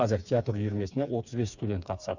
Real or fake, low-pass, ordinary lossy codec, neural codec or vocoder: fake; 7.2 kHz; none; codec, 16 kHz, 4 kbps, FreqCodec, smaller model